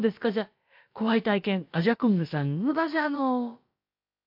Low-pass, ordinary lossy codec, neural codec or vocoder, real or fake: 5.4 kHz; MP3, 48 kbps; codec, 16 kHz, about 1 kbps, DyCAST, with the encoder's durations; fake